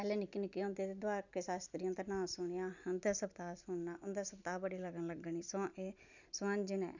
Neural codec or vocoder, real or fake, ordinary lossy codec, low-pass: none; real; none; 7.2 kHz